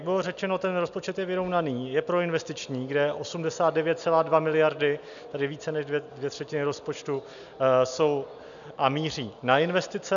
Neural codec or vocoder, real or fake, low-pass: none; real; 7.2 kHz